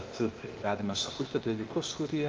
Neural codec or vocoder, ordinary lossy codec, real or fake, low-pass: codec, 16 kHz, 0.8 kbps, ZipCodec; Opus, 32 kbps; fake; 7.2 kHz